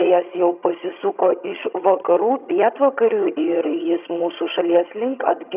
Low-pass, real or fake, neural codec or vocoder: 3.6 kHz; fake; vocoder, 22.05 kHz, 80 mel bands, HiFi-GAN